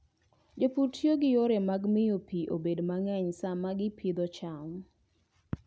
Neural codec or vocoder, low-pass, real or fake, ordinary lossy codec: none; none; real; none